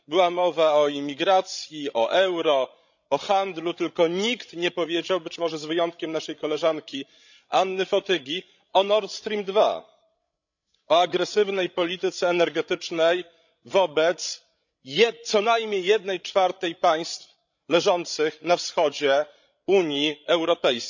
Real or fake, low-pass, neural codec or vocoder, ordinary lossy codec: fake; 7.2 kHz; codec, 16 kHz, 16 kbps, FreqCodec, larger model; none